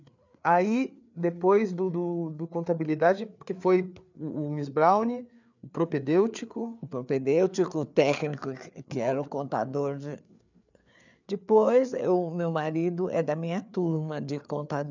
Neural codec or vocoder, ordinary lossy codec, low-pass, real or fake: codec, 16 kHz, 4 kbps, FreqCodec, larger model; none; 7.2 kHz; fake